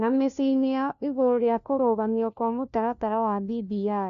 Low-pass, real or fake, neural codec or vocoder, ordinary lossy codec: 7.2 kHz; fake; codec, 16 kHz, 1 kbps, FunCodec, trained on LibriTTS, 50 frames a second; none